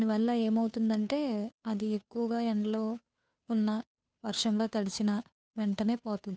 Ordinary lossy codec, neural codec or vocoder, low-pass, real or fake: none; codec, 16 kHz, 2 kbps, FunCodec, trained on Chinese and English, 25 frames a second; none; fake